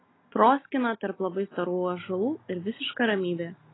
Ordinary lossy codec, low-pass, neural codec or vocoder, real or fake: AAC, 16 kbps; 7.2 kHz; none; real